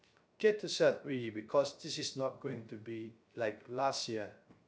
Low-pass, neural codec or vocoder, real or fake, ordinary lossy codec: none; codec, 16 kHz, 0.3 kbps, FocalCodec; fake; none